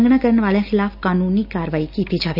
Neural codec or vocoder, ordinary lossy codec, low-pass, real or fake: none; none; 5.4 kHz; real